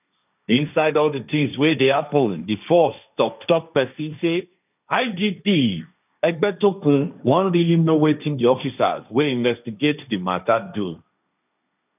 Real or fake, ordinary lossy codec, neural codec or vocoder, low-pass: fake; none; codec, 16 kHz, 1.1 kbps, Voila-Tokenizer; 3.6 kHz